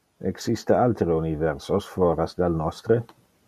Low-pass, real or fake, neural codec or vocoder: 14.4 kHz; real; none